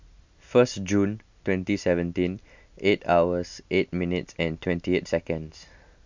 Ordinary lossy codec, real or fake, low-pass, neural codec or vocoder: MP3, 64 kbps; real; 7.2 kHz; none